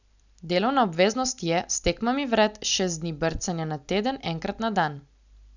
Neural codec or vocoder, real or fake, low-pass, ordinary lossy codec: none; real; 7.2 kHz; none